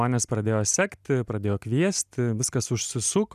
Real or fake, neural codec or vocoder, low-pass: real; none; 14.4 kHz